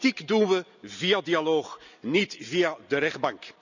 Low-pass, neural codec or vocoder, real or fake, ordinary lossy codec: 7.2 kHz; none; real; none